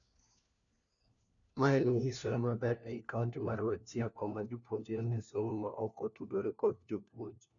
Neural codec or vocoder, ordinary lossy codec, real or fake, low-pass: codec, 16 kHz, 1 kbps, FunCodec, trained on LibriTTS, 50 frames a second; none; fake; 7.2 kHz